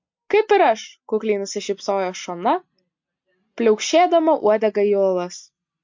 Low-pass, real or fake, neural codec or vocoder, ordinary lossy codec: 7.2 kHz; real; none; MP3, 48 kbps